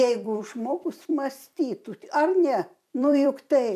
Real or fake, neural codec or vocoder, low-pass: fake; vocoder, 44.1 kHz, 128 mel bands every 256 samples, BigVGAN v2; 14.4 kHz